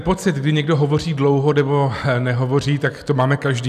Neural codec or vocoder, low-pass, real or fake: none; 14.4 kHz; real